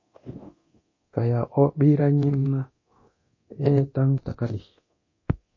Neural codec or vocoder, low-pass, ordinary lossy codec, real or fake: codec, 24 kHz, 0.9 kbps, DualCodec; 7.2 kHz; MP3, 32 kbps; fake